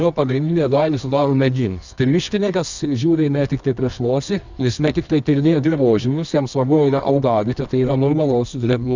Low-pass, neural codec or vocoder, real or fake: 7.2 kHz; codec, 24 kHz, 0.9 kbps, WavTokenizer, medium music audio release; fake